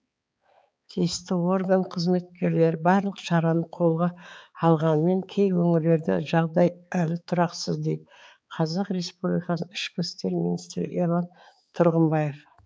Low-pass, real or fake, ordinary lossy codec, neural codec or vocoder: none; fake; none; codec, 16 kHz, 4 kbps, X-Codec, HuBERT features, trained on balanced general audio